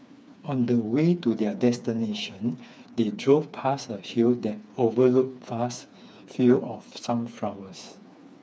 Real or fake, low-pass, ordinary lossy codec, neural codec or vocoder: fake; none; none; codec, 16 kHz, 4 kbps, FreqCodec, smaller model